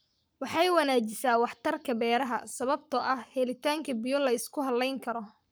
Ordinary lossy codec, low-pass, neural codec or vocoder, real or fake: none; none; codec, 44.1 kHz, 7.8 kbps, Pupu-Codec; fake